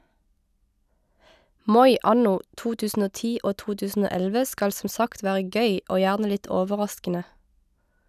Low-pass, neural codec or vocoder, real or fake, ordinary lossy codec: 14.4 kHz; none; real; none